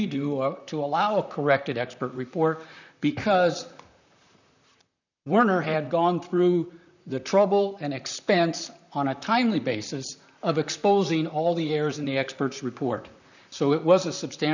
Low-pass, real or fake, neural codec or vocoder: 7.2 kHz; fake; vocoder, 44.1 kHz, 128 mel bands, Pupu-Vocoder